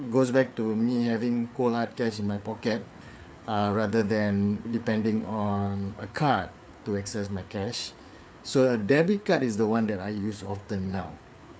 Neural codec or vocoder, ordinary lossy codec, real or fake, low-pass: codec, 16 kHz, 4 kbps, FreqCodec, larger model; none; fake; none